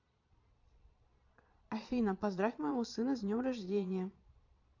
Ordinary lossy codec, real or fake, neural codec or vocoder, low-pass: none; fake; vocoder, 22.05 kHz, 80 mel bands, Vocos; 7.2 kHz